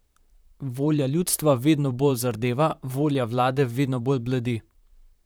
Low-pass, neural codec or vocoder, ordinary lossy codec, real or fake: none; vocoder, 44.1 kHz, 128 mel bands, Pupu-Vocoder; none; fake